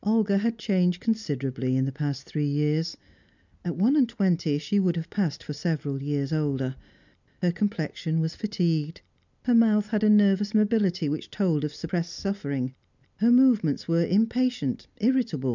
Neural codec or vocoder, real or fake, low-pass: none; real; 7.2 kHz